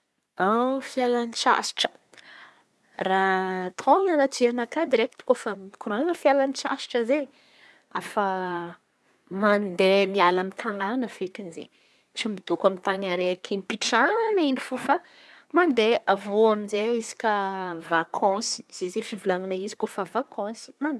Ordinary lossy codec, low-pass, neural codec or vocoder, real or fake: none; none; codec, 24 kHz, 1 kbps, SNAC; fake